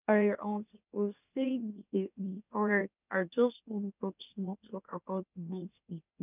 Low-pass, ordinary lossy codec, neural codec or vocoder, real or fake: 3.6 kHz; none; autoencoder, 44.1 kHz, a latent of 192 numbers a frame, MeloTTS; fake